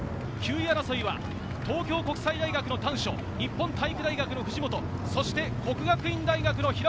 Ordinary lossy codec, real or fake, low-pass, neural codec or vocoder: none; real; none; none